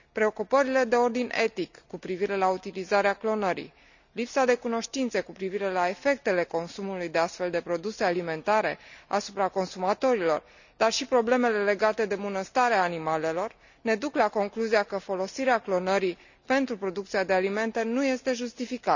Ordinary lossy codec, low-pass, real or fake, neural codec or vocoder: none; 7.2 kHz; real; none